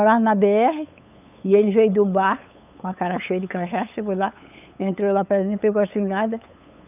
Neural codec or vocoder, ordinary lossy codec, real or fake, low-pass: codec, 16 kHz, 8 kbps, FunCodec, trained on LibriTTS, 25 frames a second; none; fake; 3.6 kHz